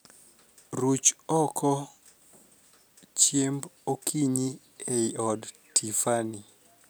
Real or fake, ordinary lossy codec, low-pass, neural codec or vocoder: real; none; none; none